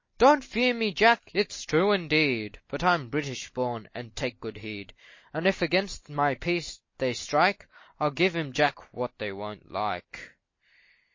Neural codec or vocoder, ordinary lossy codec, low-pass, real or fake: none; MP3, 32 kbps; 7.2 kHz; real